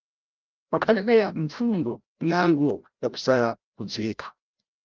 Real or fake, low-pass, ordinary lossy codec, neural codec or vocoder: fake; 7.2 kHz; Opus, 24 kbps; codec, 16 kHz, 0.5 kbps, FreqCodec, larger model